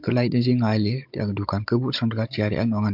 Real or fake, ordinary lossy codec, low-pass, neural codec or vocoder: fake; MP3, 48 kbps; 5.4 kHz; codec, 16 kHz, 16 kbps, FunCodec, trained on Chinese and English, 50 frames a second